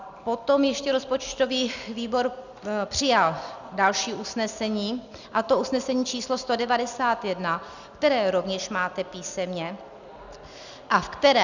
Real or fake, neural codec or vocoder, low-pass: real; none; 7.2 kHz